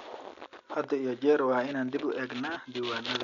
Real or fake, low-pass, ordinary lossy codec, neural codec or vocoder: real; 7.2 kHz; none; none